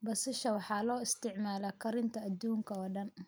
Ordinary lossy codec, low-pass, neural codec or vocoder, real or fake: none; none; none; real